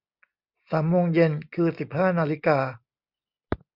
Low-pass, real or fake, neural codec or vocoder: 5.4 kHz; real; none